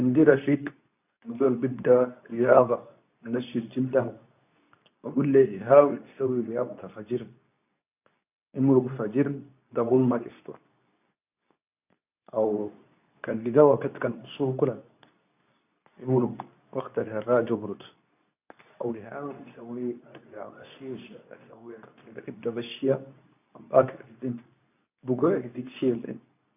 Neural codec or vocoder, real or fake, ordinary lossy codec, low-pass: codec, 24 kHz, 0.9 kbps, WavTokenizer, medium speech release version 2; fake; none; 3.6 kHz